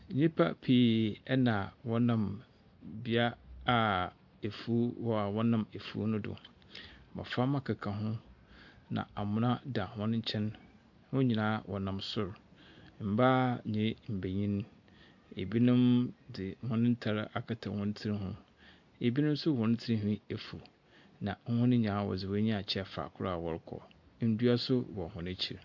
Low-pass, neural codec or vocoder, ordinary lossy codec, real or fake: 7.2 kHz; none; MP3, 64 kbps; real